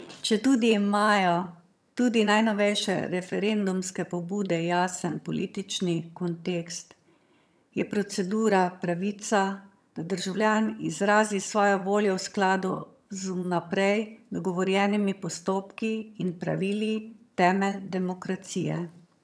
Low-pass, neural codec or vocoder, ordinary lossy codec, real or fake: none; vocoder, 22.05 kHz, 80 mel bands, HiFi-GAN; none; fake